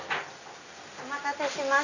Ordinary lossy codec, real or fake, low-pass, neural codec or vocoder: none; real; 7.2 kHz; none